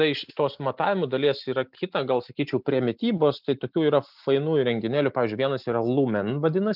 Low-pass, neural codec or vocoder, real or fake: 5.4 kHz; none; real